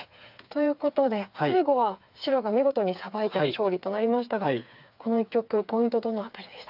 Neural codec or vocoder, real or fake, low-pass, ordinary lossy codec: codec, 16 kHz, 4 kbps, FreqCodec, smaller model; fake; 5.4 kHz; AAC, 48 kbps